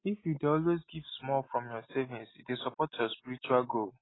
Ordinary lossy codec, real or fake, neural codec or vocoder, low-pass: AAC, 16 kbps; real; none; 7.2 kHz